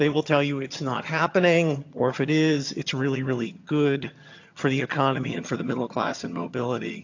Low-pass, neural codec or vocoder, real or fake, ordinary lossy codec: 7.2 kHz; vocoder, 22.05 kHz, 80 mel bands, HiFi-GAN; fake; AAC, 48 kbps